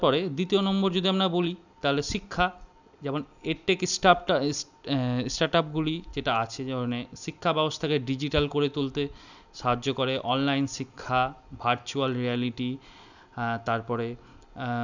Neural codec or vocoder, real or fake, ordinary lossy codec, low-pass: none; real; none; 7.2 kHz